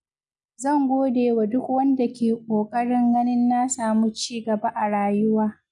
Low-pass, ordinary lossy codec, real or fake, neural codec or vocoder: 10.8 kHz; none; real; none